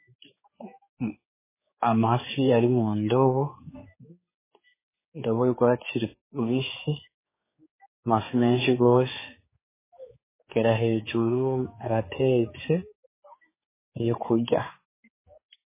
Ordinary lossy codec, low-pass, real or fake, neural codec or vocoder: MP3, 16 kbps; 3.6 kHz; fake; codec, 16 kHz, 4 kbps, X-Codec, HuBERT features, trained on general audio